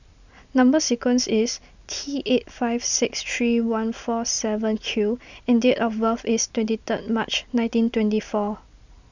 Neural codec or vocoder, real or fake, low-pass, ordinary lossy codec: none; real; 7.2 kHz; none